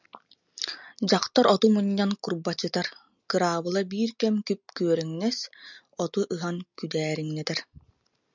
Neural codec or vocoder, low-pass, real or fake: none; 7.2 kHz; real